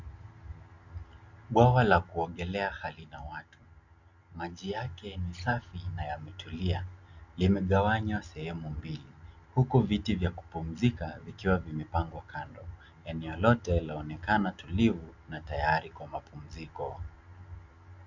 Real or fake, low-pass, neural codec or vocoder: real; 7.2 kHz; none